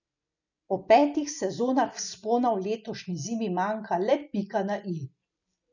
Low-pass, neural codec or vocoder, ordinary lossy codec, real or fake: 7.2 kHz; none; none; real